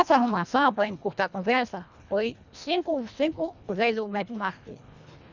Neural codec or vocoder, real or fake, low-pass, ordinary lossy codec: codec, 24 kHz, 1.5 kbps, HILCodec; fake; 7.2 kHz; none